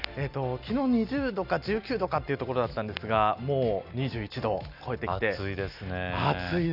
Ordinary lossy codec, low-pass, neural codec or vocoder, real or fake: none; 5.4 kHz; none; real